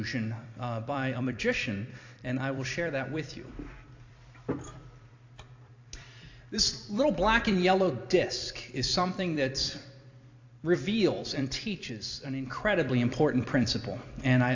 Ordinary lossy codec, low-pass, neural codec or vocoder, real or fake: AAC, 48 kbps; 7.2 kHz; vocoder, 44.1 kHz, 128 mel bands every 256 samples, BigVGAN v2; fake